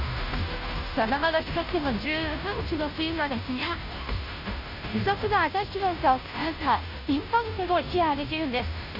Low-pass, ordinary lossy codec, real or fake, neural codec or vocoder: 5.4 kHz; none; fake; codec, 16 kHz, 0.5 kbps, FunCodec, trained on Chinese and English, 25 frames a second